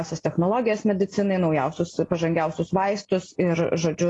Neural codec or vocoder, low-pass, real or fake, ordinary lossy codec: none; 10.8 kHz; real; AAC, 32 kbps